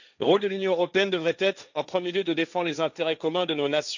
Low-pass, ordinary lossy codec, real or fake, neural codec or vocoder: 7.2 kHz; none; fake; codec, 16 kHz, 1.1 kbps, Voila-Tokenizer